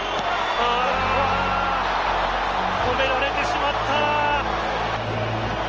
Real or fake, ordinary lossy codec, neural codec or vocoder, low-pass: real; Opus, 24 kbps; none; 7.2 kHz